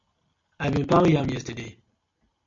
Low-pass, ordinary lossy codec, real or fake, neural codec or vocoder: 7.2 kHz; MP3, 48 kbps; real; none